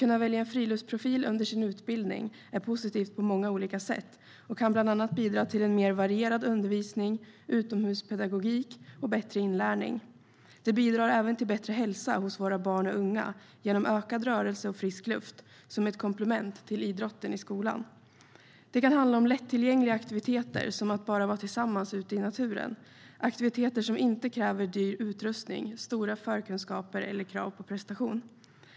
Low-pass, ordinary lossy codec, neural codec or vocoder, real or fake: none; none; none; real